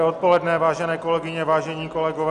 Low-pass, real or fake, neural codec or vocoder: 10.8 kHz; real; none